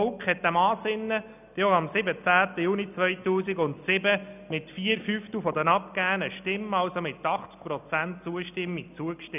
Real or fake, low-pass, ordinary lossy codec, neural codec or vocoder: real; 3.6 kHz; none; none